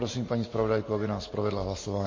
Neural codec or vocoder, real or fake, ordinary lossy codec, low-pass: none; real; MP3, 32 kbps; 7.2 kHz